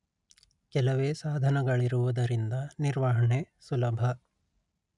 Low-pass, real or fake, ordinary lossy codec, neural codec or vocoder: 10.8 kHz; real; none; none